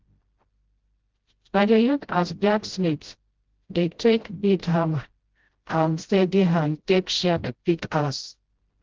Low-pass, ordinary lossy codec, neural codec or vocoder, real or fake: 7.2 kHz; Opus, 16 kbps; codec, 16 kHz, 0.5 kbps, FreqCodec, smaller model; fake